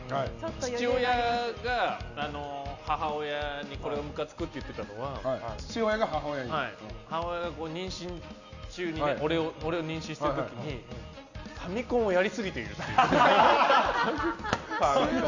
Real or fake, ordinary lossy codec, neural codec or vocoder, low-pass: real; none; none; 7.2 kHz